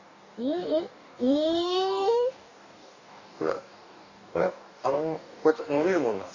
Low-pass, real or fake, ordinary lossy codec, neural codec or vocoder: 7.2 kHz; fake; none; codec, 44.1 kHz, 2.6 kbps, DAC